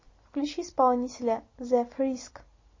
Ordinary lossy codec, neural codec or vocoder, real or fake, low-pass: MP3, 32 kbps; none; real; 7.2 kHz